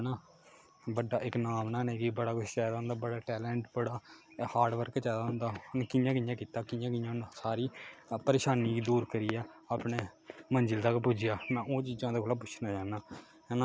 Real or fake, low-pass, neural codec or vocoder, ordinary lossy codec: real; none; none; none